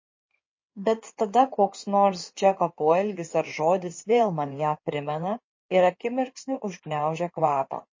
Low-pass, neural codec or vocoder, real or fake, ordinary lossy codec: 7.2 kHz; codec, 16 kHz in and 24 kHz out, 2.2 kbps, FireRedTTS-2 codec; fake; MP3, 32 kbps